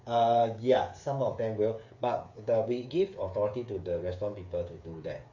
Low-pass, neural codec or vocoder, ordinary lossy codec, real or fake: 7.2 kHz; codec, 16 kHz, 8 kbps, FreqCodec, smaller model; AAC, 48 kbps; fake